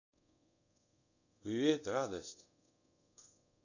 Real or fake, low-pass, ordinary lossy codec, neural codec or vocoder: fake; 7.2 kHz; none; codec, 24 kHz, 0.5 kbps, DualCodec